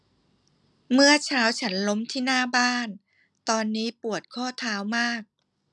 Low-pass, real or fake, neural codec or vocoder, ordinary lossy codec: 10.8 kHz; real; none; none